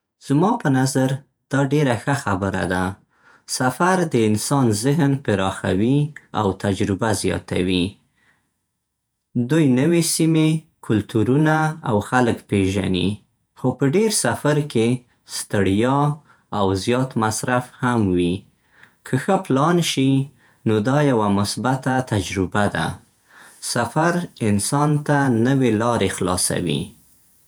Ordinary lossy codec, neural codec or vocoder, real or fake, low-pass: none; vocoder, 48 kHz, 128 mel bands, Vocos; fake; none